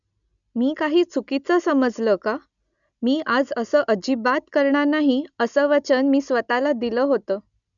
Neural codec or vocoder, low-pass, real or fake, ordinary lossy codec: none; 7.2 kHz; real; none